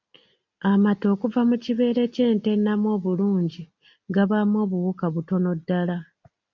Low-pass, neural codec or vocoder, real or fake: 7.2 kHz; none; real